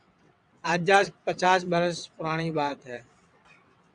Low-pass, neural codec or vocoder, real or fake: 9.9 kHz; vocoder, 22.05 kHz, 80 mel bands, WaveNeXt; fake